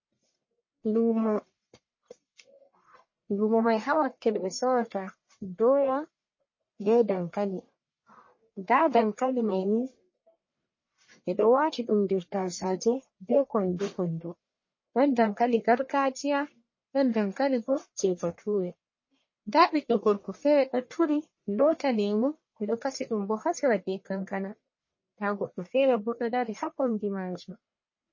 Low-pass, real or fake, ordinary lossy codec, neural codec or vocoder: 7.2 kHz; fake; MP3, 32 kbps; codec, 44.1 kHz, 1.7 kbps, Pupu-Codec